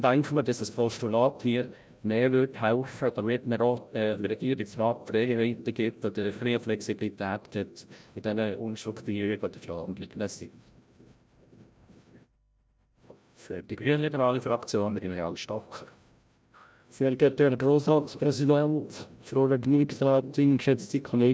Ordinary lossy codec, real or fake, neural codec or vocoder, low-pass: none; fake; codec, 16 kHz, 0.5 kbps, FreqCodec, larger model; none